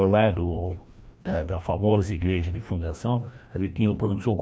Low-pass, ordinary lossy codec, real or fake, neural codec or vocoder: none; none; fake; codec, 16 kHz, 1 kbps, FreqCodec, larger model